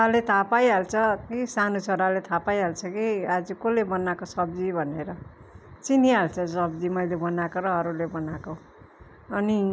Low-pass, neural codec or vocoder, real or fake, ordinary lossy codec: none; none; real; none